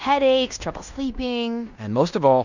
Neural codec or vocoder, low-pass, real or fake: codec, 16 kHz in and 24 kHz out, 0.9 kbps, LongCat-Audio-Codec, fine tuned four codebook decoder; 7.2 kHz; fake